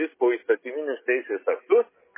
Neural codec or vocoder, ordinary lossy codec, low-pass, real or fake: none; MP3, 16 kbps; 3.6 kHz; real